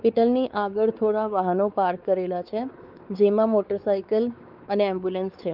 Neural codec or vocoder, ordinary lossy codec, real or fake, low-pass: codec, 16 kHz, 4 kbps, X-Codec, WavLM features, trained on Multilingual LibriSpeech; Opus, 24 kbps; fake; 5.4 kHz